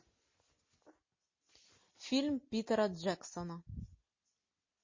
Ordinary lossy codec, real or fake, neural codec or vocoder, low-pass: MP3, 32 kbps; real; none; 7.2 kHz